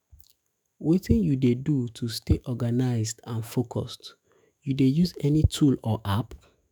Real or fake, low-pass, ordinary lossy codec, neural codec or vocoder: fake; none; none; autoencoder, 48 kHz, 128 numbers a frame, DAC-VAE, trained on Japanese speech